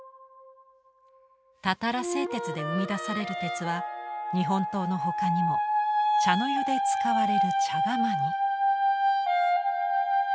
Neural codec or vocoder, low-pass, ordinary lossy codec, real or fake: none; none; none; real